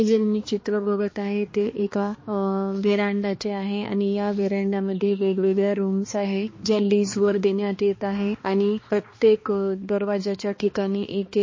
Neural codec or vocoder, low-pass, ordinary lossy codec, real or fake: codec, 16 kHz, 2 kbps, X-Codec, HuBERT features, trained on balanced general audio; 7.2 kHz; MP3, 32 kbps; fake